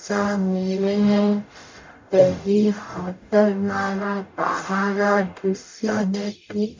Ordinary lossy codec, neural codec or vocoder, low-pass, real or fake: MP3, 48 kbps; codec, 44.1 kHz, 0.9 kbps, DAC; 7.2 kHz; fake